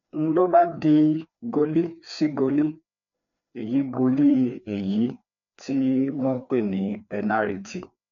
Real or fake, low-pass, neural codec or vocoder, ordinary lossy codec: fake; 7.2 kHz; codec, 16 kHz, 2 kbps, FreqCodec, larger model; none